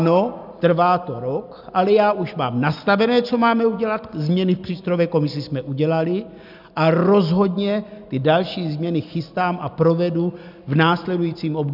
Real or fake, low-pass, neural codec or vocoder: real; 5.4 kHz; none